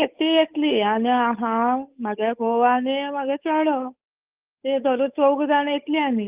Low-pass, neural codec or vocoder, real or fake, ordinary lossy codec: 3.6 kHz; codec, 16 kHz, 8 kbps, FunCodec, trained on Chinese and English, 25 frames a second; fake; Opus, 24 kbps